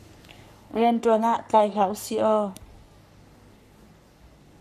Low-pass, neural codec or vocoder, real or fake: 14.4 kHz; codec, 44.1 kHz, 3.4 kbps, Pupu-Codec; fake